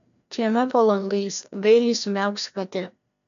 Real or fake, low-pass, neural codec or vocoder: fake; 7.2 kHz; codec, 16 kHz, 1 kbps, FreqCodec, larger model